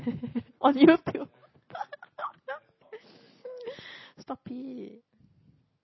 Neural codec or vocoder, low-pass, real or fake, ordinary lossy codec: none; 7.2 kHz; real; MP3, 24 kbps